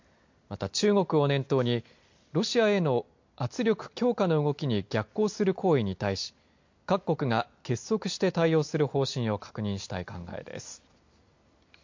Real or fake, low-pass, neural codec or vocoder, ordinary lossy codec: real; 7.2 kHz; none; MP3, 48 kbps